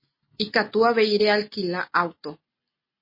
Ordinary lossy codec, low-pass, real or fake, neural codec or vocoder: MP3, 24 kbps; 5.4 kHz; real; none